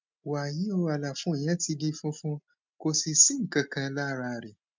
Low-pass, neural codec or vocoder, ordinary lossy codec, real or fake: 7.2 kHz; none; MP3, 64 kbps; real